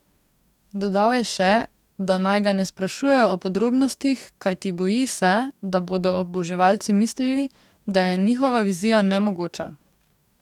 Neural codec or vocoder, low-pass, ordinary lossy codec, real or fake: codec, 44.1 kHz, 2.6 kbps, DAC; 19.8 kHz; none; fake